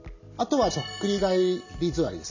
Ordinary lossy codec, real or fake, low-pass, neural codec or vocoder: none; real; 7.2 kHz; none